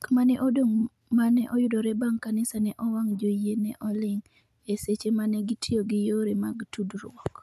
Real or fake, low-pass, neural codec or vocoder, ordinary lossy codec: real; 14.4 kHz; none; none